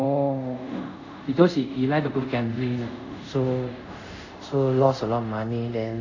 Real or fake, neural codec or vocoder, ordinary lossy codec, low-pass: fake; codec, 24 kHz, 0.5 kbps, DualCodec; none; 7.2 kHz